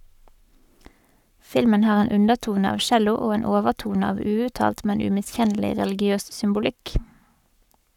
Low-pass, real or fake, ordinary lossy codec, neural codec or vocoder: 19.8 kHz; fake; none; codec, 44.1 kHz, 7.8 kbps, Pupu-Codec